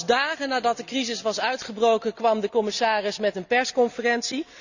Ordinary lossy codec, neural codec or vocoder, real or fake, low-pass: none; none; real; 7.2 kHz